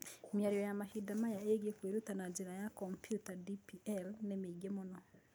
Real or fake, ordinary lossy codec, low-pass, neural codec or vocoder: real; none; none; none